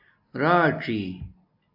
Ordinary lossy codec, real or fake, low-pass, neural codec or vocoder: AAC, 48 kbps; real; 5.4 kHz; none